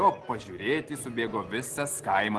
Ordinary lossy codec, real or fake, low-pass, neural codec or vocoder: Opus, 16 kbps; real; 10.8 kHz; none